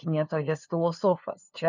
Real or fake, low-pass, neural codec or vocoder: fake; 7.2 kHz; codec, 16 kHz, 2 kbps, FunCodec, trained on LibriTTS, 25 frames a second